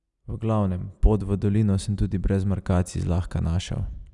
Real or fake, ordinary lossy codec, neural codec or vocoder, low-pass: real; none; none; 10.8 kHz